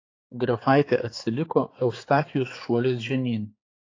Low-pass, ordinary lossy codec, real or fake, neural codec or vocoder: 7.2 kHz; AAC, 32 kbps; fake; codec, 16 kHz, 4 kbps, X-Codec, HuBERT features, trained on balanced general audio